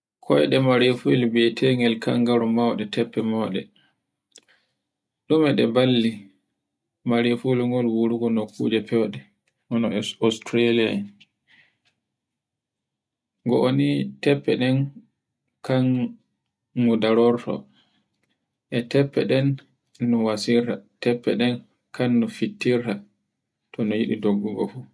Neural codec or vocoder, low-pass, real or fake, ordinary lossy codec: none; none; real; none